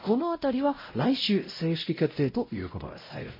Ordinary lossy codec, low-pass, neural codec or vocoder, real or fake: MP3, 24 kbps; 5.4 kHz; codec, 16 kHz, 0.5 kbps, X-Codec, WavLM features, trained on Multilingual LibriSpeech; fake